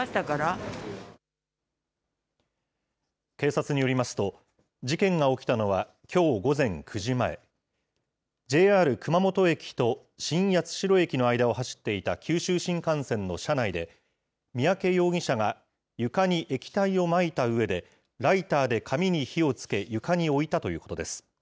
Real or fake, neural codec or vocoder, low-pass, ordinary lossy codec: real; none; none; none